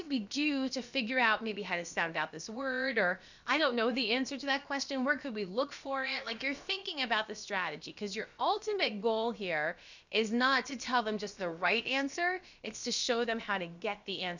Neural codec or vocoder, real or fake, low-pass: codec, 16 kHz, about 1 kbps, DyCAST, with the encoder's durations; fake; 7.2 kHz